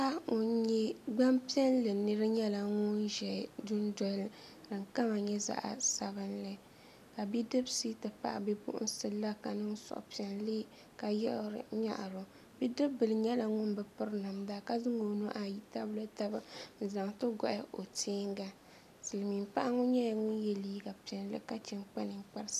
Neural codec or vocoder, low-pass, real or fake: none; 14.4 kHz; real